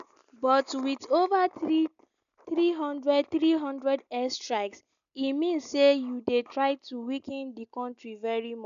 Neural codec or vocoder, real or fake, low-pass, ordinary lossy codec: none; real; 7.2 kHz; none